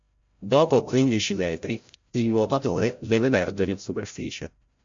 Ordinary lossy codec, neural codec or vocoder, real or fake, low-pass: MP3, 48 kbps; codec, 16 kHz, 0.5 kbps, FreqCodec, larger model; fake; 7.2 kHz